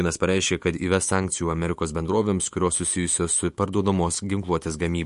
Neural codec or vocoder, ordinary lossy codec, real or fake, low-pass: autoencoder, 48 kHz, 128 numbers a frame, DAC-VAE, trained on Japanese speech; MP3, 48 kbps; fake; 14.4 kHz